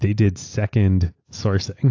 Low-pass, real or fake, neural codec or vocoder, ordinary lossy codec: 7.2 kHz; real; none; AAC, 48 kbps